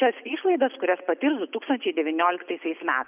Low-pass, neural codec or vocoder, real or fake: 3.6 kHz; none; real